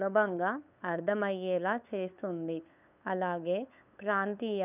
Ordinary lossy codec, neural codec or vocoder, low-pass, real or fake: none; codec, 16 kHz, 8 kbps, FunCodec, trained on LibriTTS, 25 frames a second; 3.6 kHz; fake